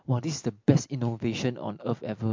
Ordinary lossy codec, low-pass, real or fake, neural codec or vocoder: MP3, 48 kbps; 7.2 kHz; real; none